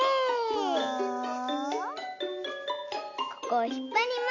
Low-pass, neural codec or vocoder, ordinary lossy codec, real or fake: 7.2 kHz; none; none; real